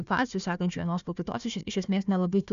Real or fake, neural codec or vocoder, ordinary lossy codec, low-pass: fake; codec, 16 kHz, 1 kbps, FunCodec, trained on Chinese and English, 50 frames a second; AAC, 96 kbps; 7.2 kHz